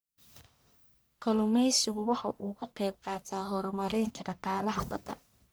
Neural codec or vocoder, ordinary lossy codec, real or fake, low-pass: codec, 44.1 kHz, 1.7 kbps, Pupu-Codec; none; fake; none